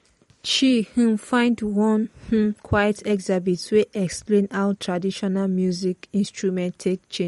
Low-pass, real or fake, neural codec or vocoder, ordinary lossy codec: 19.8 kHz; fake; vocoder, 44.1 kHz, 128 mel bands every 256 samples, BigVGAN v2; MP3, 48 kbps